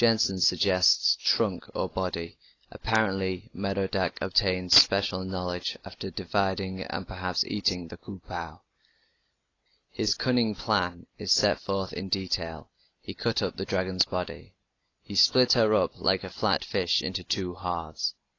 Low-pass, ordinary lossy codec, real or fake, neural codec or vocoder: 7.2 kHz; AAC, 32 kbps; real; none